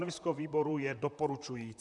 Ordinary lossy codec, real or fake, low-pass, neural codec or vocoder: MP3, 96 kbps; fake; 10.8 kHz; vocoder, 44.1 kHz, 128 mel bands, Pupu-Vocoder